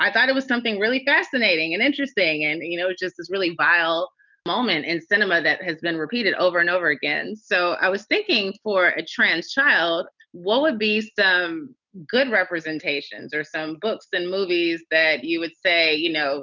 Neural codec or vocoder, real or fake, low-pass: none; real; 7.2 kHz